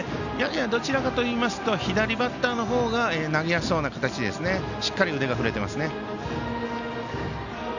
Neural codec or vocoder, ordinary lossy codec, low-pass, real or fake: none; none; 7.2 kHz; real